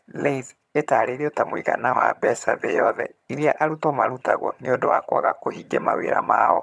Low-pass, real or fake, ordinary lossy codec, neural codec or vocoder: none; fake; none; vocoder, 22.05 kHz, 80 mel bands, HiFi-GAN